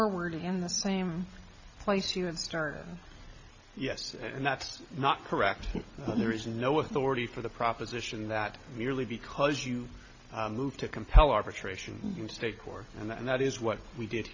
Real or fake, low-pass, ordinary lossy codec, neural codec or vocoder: real; 7.2 kHz; AAC, 48 kbps; none